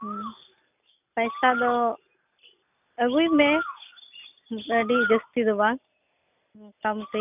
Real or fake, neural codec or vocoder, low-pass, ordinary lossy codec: real; none; 3.6 kHz; none